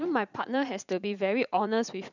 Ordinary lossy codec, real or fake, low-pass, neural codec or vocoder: none; fake; 7.2 kHz; vocoder, 44.1 kHz, 80 mel bands, Vocos